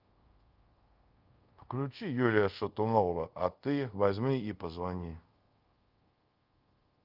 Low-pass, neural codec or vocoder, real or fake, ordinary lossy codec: 5.4 kHz; codec, 24 kHz, 0.5 kbps, DualCodec; fake; Opus, 24 kbps